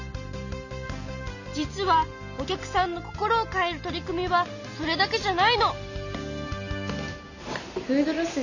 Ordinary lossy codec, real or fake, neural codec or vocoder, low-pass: none; real; none; 7.2 kHz